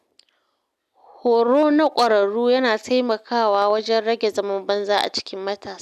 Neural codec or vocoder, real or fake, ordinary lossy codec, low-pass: none; real; none; 14.4 kHz